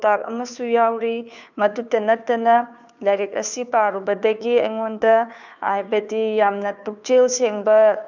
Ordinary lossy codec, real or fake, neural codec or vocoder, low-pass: none; fake; codec, 16 kHz, 2 kbps, FunCodec, trained on Chinese and English, 25 frames a second; 7.2 kHz